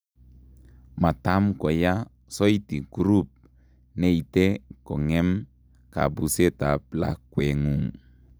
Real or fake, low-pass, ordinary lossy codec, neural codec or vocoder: real; none; none; none